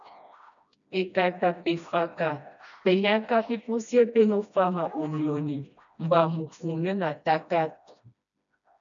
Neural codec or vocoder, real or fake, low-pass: codec, 16 kHz, 1 kbps, FreqCodec, smaller model; fake; 7.2 kHz